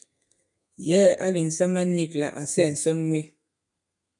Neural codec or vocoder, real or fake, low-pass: codec, 32 kHz, 1.9 kbps, SNAC; fake; 10.8 kHz